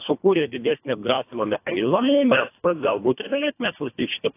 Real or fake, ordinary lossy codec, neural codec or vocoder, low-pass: fake; AAC, 24 kbps; codec, 24 kHz, 1.5 kbps, HILCodec; 3.6 kHz